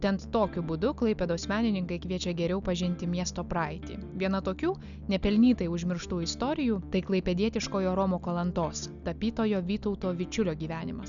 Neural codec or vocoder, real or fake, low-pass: none; real; 7.2 kHz